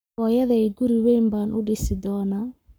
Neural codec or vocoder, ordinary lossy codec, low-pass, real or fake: codec, 44.1 kHz, 7.8 kbps, Pupu-Codec; none; none; fake